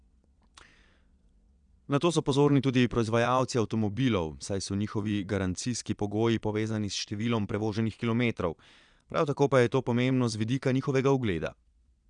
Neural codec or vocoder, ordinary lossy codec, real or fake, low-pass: vocoder, 22.05 kHz, 80 mel bands, Vocos; none; fake; 9.9 kHz